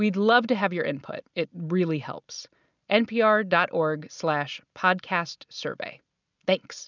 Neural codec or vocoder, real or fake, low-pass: none; real; 7.2 kHz